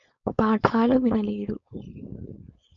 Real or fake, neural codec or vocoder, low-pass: fake; codec, 16 kHz, 4.8 kbps, FACodec; 7.2 kHz